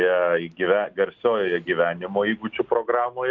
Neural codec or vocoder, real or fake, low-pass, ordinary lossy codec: none; real; 7.2 kHz; Opus, 24 kbps